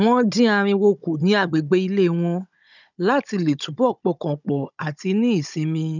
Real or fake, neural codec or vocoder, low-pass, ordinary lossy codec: fake; codec, 16 kHz, 16 kbps, FunCodec, trained on Chinese and English, 50 frames a second; 7.2 kHz; none